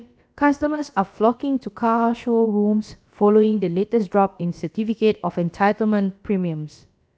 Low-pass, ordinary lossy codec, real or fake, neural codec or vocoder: none; none; fake; codec, 16 kHz, about 1 kbps, DyCAST, with the encoder's durations